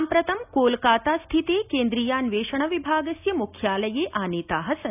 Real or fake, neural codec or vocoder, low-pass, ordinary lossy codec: real; none; 3.6 kHz; none